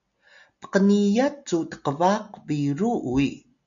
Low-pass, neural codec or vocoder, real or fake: 7.2 kHz; none; real